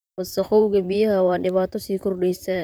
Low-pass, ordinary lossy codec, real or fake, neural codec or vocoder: none; none; fake; vocoder, 44.1 kHz, 128 mel bands, Pupu-Vocoder